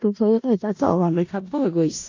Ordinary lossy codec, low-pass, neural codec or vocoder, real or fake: AAC, 48 kbps; 7.2 kHz; codec, 16 kHz in and 24 kHz out, 0.4 kbps, LongCat-Audio-Codec, four codebook decoder; fake